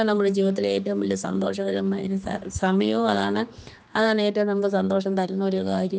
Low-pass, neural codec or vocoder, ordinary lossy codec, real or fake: none; codec, 16 kHz, 2 kbps, X-Codec, HuBERT features, trained on general audio; none; fake